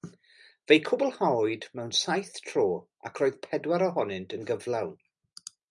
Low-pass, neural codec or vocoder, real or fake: 10.8 kHz; none; real